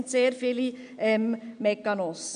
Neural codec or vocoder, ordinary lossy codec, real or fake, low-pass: none; none; real; 9.9 kHz